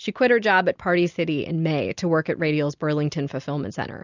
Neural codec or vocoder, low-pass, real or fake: none; 7.2 kHz; real